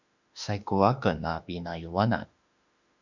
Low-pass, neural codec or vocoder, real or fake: 7.2 kHz; autoencoder, 48 kHz, 32 numbers a frame, DAC-VAE, trained on Japanese speech; fake